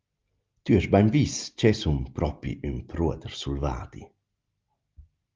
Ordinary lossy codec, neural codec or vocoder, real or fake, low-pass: Opus, 24 kbps; none; real; 7.2 kHz